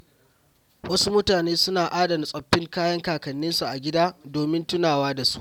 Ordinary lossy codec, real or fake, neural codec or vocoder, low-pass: none; real; none; 19.8 kHz